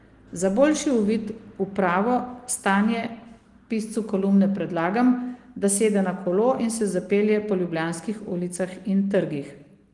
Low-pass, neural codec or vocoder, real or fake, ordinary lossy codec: 10.8 kHz; none; real; Opus, 24 kbps